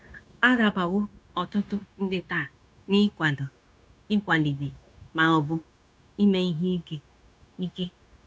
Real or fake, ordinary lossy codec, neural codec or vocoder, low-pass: fake; none; codec, 16 kHz, 0.9 kbps, LongCat-Audio-Codec; none